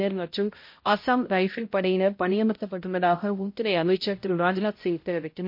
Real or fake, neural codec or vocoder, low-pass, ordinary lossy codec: fake; codec, 16 kHz, 0.5 kbps, X-Codec, HuBERT features, trained on balanced general audio; 5.4 kHz; MP3, 32 kbps